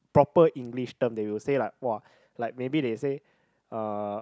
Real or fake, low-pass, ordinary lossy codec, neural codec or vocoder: real; none; none; none